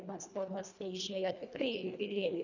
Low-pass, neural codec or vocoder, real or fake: 7.2 kHz; codec, 24 kHz, 1.5 kbps, HILCodec; fake